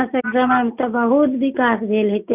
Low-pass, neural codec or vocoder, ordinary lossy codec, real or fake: 3.6 kHz; none; none; real